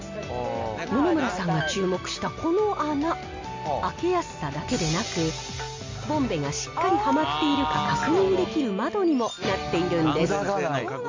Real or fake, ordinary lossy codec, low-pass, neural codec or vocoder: real; MP3, 48 kbps; 7.2 kHz; none